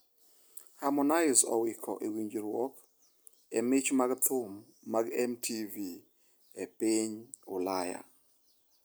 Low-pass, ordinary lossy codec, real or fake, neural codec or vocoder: none; none; real; none